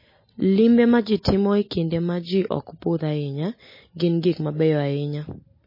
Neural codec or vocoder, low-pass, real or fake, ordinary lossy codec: none; 5.4 kHz; real; MP3, 24 kbps